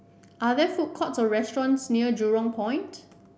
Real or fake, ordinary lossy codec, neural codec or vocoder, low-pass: real; none; none; none